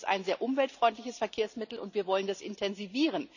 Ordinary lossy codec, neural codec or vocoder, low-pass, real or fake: none; none; 7.2 kHz; real